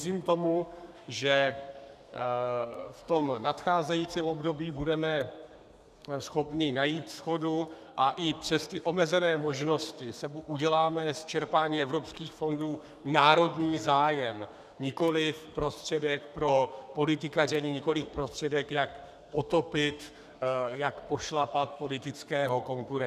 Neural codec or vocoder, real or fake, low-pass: codec, 32 kHz, 1.9 kbps, SNAC; fake; 14.4 kHz